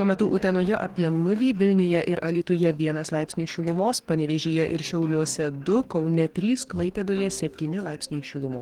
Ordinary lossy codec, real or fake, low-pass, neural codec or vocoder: Opus, 24 kbps; fake; 19.8 kHz; codec, 44.1 kHz, 2.6 kbps, DAC